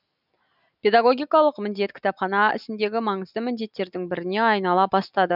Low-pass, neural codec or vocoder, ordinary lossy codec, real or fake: 5.4 kHz; none; none; real